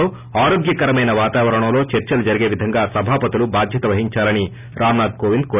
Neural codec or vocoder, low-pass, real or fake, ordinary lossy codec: none; 3.6 kHz; real; none